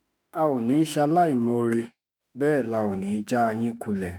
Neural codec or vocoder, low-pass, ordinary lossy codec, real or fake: autoencoder, 48 kHz, 32 numbers a frame, DAC-VAE, trained on Japanese speech; none; none; fake